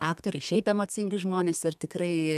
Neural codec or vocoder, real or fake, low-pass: codec, 32 kHz, 1.9 kbps, SNAC; fake; 14.4 kHz